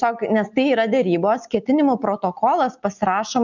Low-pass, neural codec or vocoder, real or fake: 7.2 kHz; none; real